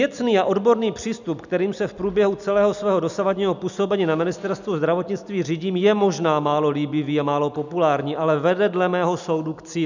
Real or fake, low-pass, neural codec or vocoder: real; 7.2 kHz; none